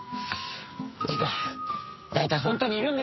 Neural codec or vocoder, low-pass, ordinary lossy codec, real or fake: codec, 44.1 kHz, 2.6 kbps, SNAC; 7.2 kHz; MP3, 24 kbps; fake